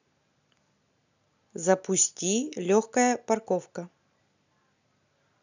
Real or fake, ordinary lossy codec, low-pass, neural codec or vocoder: real; none; 7.2 kHz; none